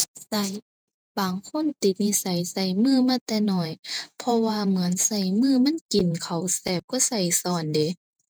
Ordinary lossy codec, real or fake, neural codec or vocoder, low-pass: none; fake; vocoder, 48 kHz, 128 mel bands, Vocos; none